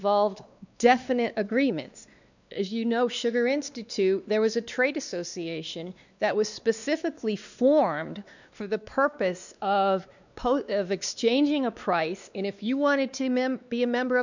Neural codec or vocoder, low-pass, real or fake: codec, 16 kHz, 2 kbps, X-Codec, WavLM features, trained on Multilingual LibriSpeech; 7.2 kHz; fake